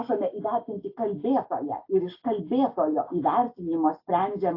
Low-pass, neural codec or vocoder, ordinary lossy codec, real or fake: 5.4 kHz; none; AAC, 32 kbps; real